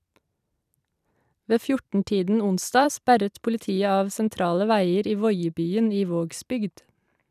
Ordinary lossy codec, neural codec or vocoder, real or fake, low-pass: none; vocoder, 44.1 kHz, 128 mel bands every 256 samples, BigVGAN v2; fake; 14.4 kHz